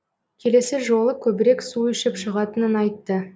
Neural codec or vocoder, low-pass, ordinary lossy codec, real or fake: none; none; none; real